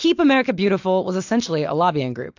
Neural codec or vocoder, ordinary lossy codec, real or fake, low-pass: none; AAC, 48 kbps; real; 7.2 kHz